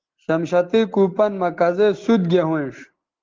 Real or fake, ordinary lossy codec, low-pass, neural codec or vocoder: real; Opus, 16 kbps; 7.2 kHz; none